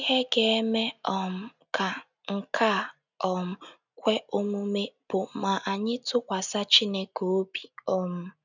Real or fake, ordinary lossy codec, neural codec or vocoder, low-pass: real; none; none; 7.2 kHz